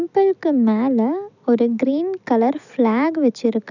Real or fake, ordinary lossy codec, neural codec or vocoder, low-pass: real; none; none; 7.2 kHz